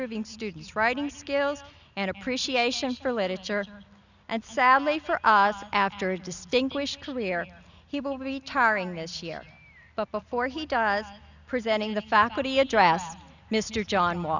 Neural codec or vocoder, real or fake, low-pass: none; real; 7.2 kHz